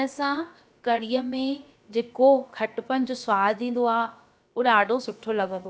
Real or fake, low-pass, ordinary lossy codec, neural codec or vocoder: fake; none; none; codec, 16 kHz, about 1 kbps, DyCAST, with the encoder's durations